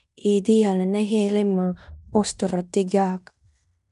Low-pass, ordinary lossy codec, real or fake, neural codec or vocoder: 10.8 kHz; none; fake; codec, 16 kHz in and 24 kHz out, 0.9 kbps, LongCat-Audio-Codec, fine tuned four codebook decoder